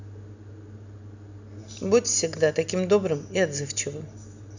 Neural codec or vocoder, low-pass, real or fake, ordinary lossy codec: none; 7.2 kHz; real; none